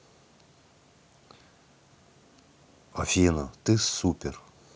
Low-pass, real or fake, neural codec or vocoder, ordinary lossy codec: none; real; none; none